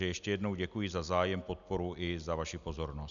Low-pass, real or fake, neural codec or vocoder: 7.2 kHz; real; none